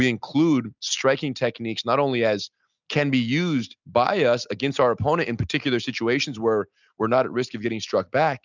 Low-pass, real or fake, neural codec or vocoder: 7.2 kHz; real; none